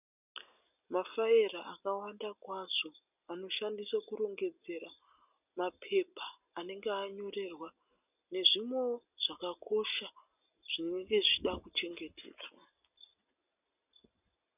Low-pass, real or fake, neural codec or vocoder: 3.6 kHz; real; none